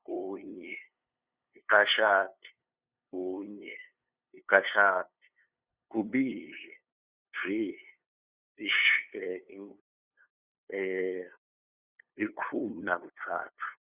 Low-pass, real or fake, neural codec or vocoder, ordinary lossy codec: 3.6 kHz; fake; codec, 16 kHz, 2 kbps, FunCodec, trained on LibriTTS, 25 frames a second; Opus, 64 kbps